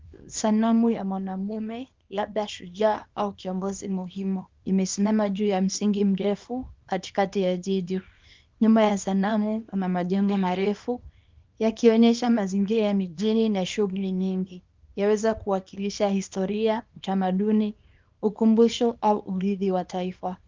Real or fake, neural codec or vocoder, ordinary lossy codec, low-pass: fake; codec, 24 kHz, 0.9 kbps, WavTokenizer, small release; Opus, 32 kbps; 7.2 kHz